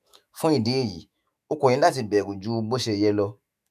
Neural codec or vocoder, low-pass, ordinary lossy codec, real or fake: autoencoder, 48 kHz, 128 numbers a frame, DAC-VAE, trained on Japanese speech; 14.4 kHz; none; fake